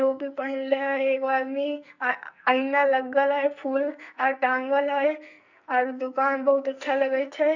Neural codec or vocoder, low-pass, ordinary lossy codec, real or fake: codec, 44.1 kHz, 2.6 kbps, SNAC; 7.2 kHz; none; fake